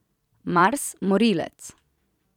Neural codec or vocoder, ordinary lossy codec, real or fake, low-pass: vocoder, 44.1 kHz, 128 mel bands every 256 samples, BigVGAN v2; none; fake; 19.8 kHz